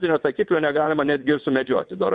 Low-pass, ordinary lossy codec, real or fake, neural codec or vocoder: 9.9 kHz; MP3, 64 kbps; fake; vocoder, 22.05 kHz, 80 mel bands, WaveNeXt